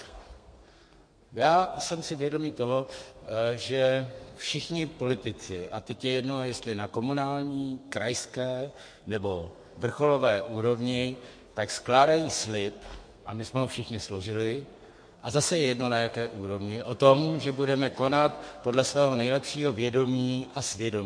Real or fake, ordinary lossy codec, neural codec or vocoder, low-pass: fake; MP3, 48 kbps; codec, 32 kHz, 1.9 kbps, SNAC; 9.9 kHz